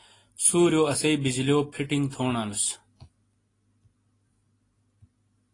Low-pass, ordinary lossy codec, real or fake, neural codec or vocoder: 10.8 kHz; AAC, 32 kbps; real; none